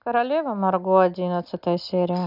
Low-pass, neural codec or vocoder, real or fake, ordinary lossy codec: 5.4 kHz; none; real; none